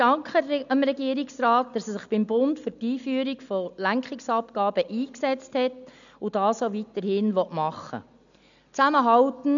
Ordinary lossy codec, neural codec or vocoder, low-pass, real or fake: none; none; 7.2 kHz; real